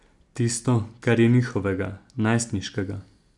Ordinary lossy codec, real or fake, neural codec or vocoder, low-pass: none; real; none; 10.8 kHz